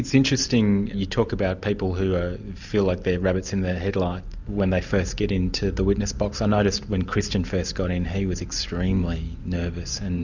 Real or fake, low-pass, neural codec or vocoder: real; 7.2 kHz; none